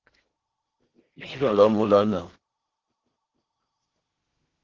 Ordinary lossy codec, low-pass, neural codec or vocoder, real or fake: Opus, 16 kbps; 7.2 kHz; codec, 16 kHz in and 24 kHz out, 0.6 kbps, FocalCodec, streaming, 4096 codes; fake